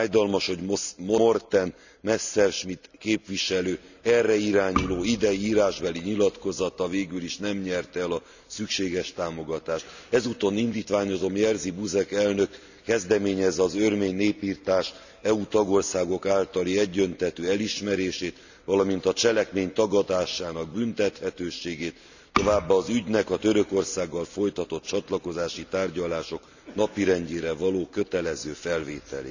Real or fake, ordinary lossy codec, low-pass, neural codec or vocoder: real; none; 7.2 kHz; none